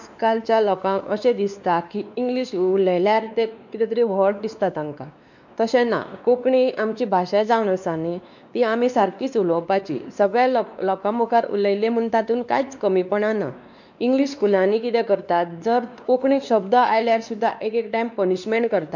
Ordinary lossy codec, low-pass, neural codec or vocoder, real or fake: none; 7.2 kHz; codec, 16 kHz, 2 kbps, X-Codec, WavLM features, trained on Multilingual LibriSpeech; fake